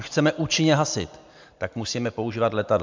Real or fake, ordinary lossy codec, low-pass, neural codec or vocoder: real; MP3, 64 kbps; 7.2 kHz; none